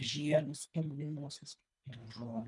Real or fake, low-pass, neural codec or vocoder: fake; 10.8 kHz; codec, 24 kHz, 1.5 kbps, HILCodec